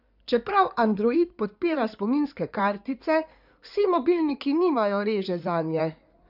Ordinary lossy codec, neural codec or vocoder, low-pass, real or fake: none; codec, 16 kHz in and 24 kHz out, 2.2 kbps, FireRedTTS-2 codec; 5.4 kHz; fake